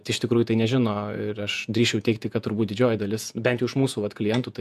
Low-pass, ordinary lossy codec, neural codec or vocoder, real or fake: 14.4 kHz; MP3, 96 kbps; vocoder, 48 kHz, 128 mel bands, Vocos; fake